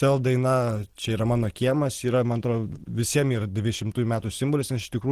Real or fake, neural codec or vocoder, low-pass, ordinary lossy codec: real; none; 14.4 kHz; Opus, 16 kbps